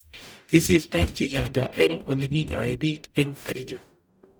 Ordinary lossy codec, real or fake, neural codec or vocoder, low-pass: none; fake; codec, 44.1 kHz, 0.9 kbps, DAC; none